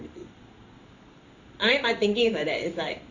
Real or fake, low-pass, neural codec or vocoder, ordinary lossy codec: fake; 7.2 kHz; codec, 16 kHz in and 24 kHz out, 1 kbps, XY-Tokenizer; none